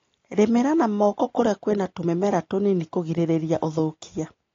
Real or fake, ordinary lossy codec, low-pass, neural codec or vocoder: real; AAC, 32 kbps; 7.2 kHz; none